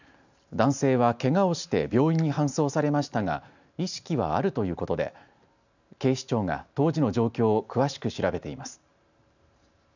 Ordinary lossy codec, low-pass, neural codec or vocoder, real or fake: none; 7.2 kHz; none; real